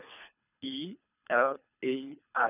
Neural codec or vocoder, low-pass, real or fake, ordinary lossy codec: codec, 24 kHz, 3 kbps, HILCodec; 3.6 kHz; fake; none